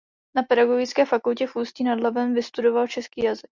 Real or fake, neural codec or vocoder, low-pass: real; none; 7.2 kHz